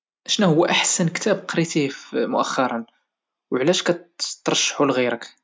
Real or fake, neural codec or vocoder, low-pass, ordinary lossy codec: real; none; none; none